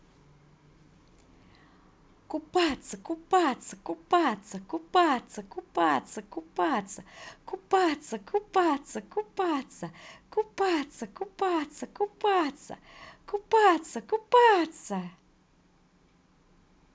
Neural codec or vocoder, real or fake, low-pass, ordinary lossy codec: none; real; none; none